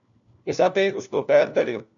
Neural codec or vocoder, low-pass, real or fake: codec, 16 kHz, 1 kbps, FunCodec, trained on LibriTTS, 50 frames a second; 7.2 kHz; fake